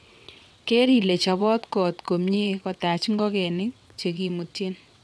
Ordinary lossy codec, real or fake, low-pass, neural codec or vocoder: none; fake; none; vocoder, 22.05 kHz, 80 mel bands, WaveNeXt